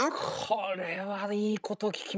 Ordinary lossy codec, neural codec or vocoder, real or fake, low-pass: none; codec, 16 kHz, 16 kbps, FreqCodec, larger model; fake; none